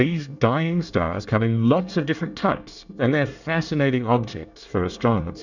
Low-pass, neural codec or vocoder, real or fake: 7.2 kHz; codec, 24 kHz, 1 kbps, SNAC; fake